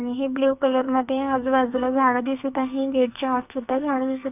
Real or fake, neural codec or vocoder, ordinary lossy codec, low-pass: fake; codec, 44.1 kHz, 2.6 kbps, SNAC; none; 3.6 kHz